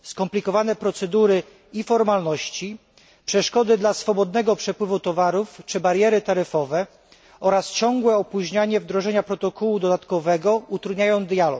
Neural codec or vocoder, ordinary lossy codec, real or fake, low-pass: none; none; real; none